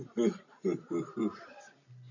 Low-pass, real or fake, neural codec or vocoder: 7.2 kHz; real; none